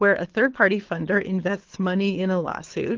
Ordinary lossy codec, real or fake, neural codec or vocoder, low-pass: Opus, 16 kbps; fake; codec, 24 kHz, 6 kbps, HILCodec; 7.2 kHz